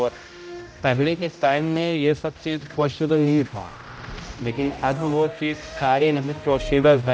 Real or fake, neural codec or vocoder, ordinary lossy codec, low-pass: fake; codec, 16 kHz, 0.5 kbps, X-Codec, HuBERT features, trained on general audio; none; none